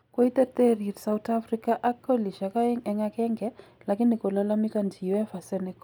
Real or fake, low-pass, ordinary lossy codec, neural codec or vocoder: real; none; none; none